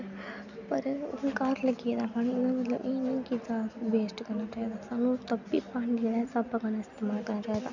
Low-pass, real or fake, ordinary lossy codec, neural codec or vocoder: 7.2 kHz; real; none; none